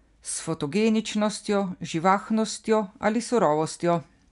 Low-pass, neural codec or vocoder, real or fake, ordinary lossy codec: 10.8 kHz; none; real; none